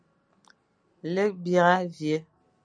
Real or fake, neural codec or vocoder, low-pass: real; none; 9.9 kHz